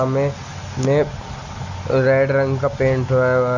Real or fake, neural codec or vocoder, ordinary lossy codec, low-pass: real; none; none; 7.2 kHz